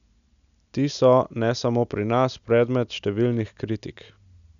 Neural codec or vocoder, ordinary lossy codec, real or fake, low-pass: none; none; real; 7.2 kHz